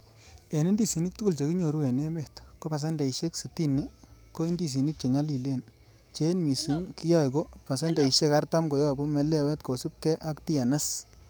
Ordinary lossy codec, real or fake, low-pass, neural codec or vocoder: none; fake; none; codec, 44.1 kHz, 7.8 kbps, DAC